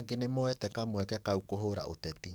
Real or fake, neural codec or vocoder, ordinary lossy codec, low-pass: fake; codec, 44.1 kHz, 7.8 kbps, DAC; none; none